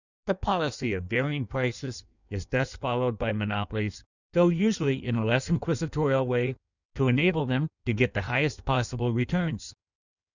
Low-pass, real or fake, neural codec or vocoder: 7.2 kHz; fake; codec, 16 kHz in and 24 kHz out, 1.1 kbps, FireRedTTS-2 codec